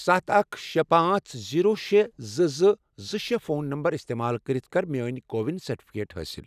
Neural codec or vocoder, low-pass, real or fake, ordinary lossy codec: none; 14.4 kHz; real; none